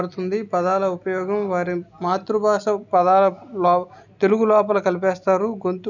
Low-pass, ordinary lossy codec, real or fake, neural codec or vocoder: 7.2 kHz; none; real; none